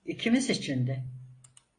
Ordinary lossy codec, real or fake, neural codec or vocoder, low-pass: AAC, 32 kbps; real; none; 9.9 kHz